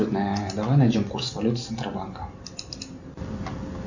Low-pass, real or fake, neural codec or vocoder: 7.2 kHz; real; none